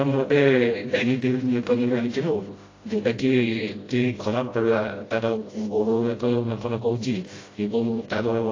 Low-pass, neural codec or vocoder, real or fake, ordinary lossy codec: 7.2 kHz; codec, 16 kHz, 0.5 kbps, FreqCodec, smaller model; fake; AAC, 32 kbps